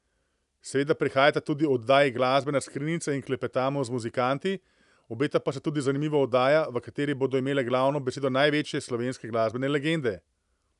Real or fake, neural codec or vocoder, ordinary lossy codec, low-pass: real; none; none; 10.8 kHz